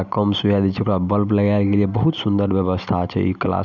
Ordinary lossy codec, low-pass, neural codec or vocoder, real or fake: none; 7.2 kHz; none; real